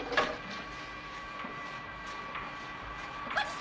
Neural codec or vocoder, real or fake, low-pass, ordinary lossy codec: none; real; none; none